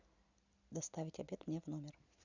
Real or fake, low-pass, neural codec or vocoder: real; 7.2 kHz; none